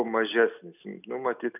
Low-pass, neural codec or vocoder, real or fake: 3.6 kHz; none; real